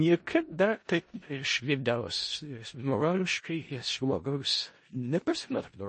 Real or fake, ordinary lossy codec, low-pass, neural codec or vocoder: fake; MP3, 32 kbps; 10.8 kHz; codec, 16 kHz in and 24 kHz out, 0.4 kbps, LongCat-Audio-Codec, four codebook decoder